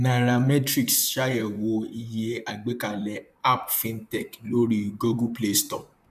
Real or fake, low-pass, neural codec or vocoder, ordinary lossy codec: fake; 14.4 kHz; vocoder, 44.1 kHz, 128 mel bands, Pupu-Vocoder; none